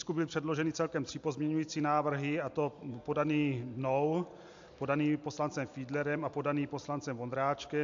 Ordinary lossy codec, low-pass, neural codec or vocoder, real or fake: AAC, 64 kbps; 7.2 kHz; none; real